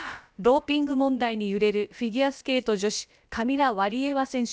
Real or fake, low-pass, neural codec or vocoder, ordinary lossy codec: fake; none; codec, 16 kHz, about 1 kbps, DyCAST, with the encoder's durations; none